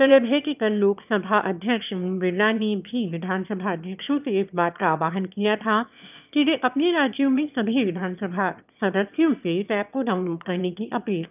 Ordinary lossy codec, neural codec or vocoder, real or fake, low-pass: none; autoencoder, 22.05 kHz, a latent of 192 numbers a frame, VITS, trained on one speaker; fake; 3.6 kHz